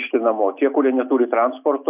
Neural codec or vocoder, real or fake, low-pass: none; real; 3.6 kHz